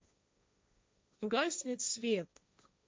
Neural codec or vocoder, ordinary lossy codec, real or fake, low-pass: codec, 16 kHz, 1.1 kbps, Voila-Tokenizer; none; fake; none